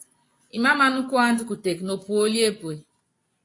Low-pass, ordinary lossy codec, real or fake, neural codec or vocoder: 10.8 kHz; AAC, 48 kbps; fake; vocoder, 24 kHz, 100 mel bands, Vocos